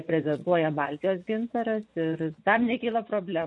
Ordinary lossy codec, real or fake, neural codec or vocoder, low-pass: MP3, 48 kbps; fake; vocoder, 24 kHz, 100 mel bands, Vocos; 10.8 kHz